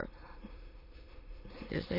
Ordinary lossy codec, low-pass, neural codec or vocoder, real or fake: MP3, 24 kbps; 5.4 kHz; autoencoder, 22.05 kHz, a latent of 192 numbers a frame, VITS, trained on many speakers; fake